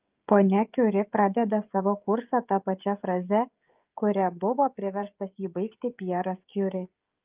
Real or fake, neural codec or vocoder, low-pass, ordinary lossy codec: fake; codec, 16 kHz, 16 kbps, FreqCodec, smaller model; 3.6 kHz; Opus, 32 kbps